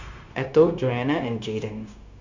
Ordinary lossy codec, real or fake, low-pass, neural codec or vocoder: Opus, 64 kbps; fake; 7.2 kHz; codec, 16 kHz, 0.9 kbps, LongCat-Audio-Codec